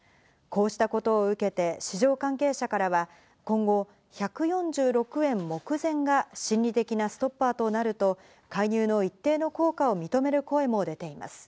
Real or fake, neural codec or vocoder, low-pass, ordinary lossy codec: real; none; none; none